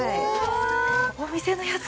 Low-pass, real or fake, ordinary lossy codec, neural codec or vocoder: none; real; none; none